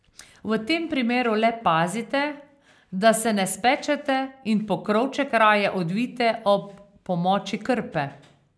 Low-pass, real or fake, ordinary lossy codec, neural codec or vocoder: none; real; none; none